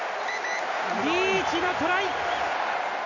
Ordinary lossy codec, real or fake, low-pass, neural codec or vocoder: none; real; 7.2 kHz; none